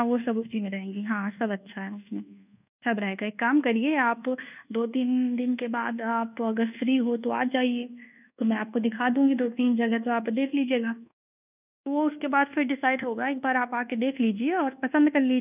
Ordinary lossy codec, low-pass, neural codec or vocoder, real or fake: none; 3.6 kHz; codec, 24 kHz, 1.2 kbps, DualCodec; fake